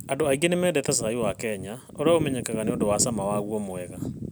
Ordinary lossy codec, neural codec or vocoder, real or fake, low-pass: none; none; real; none